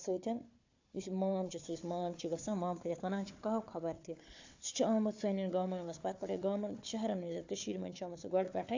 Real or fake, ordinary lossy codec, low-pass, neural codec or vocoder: fake; AAC, 48 kbps; 7.2 kHz; codec, 16 kHz, 4 kbps, FunCodec, trained on Chinese and English, 50 frames a second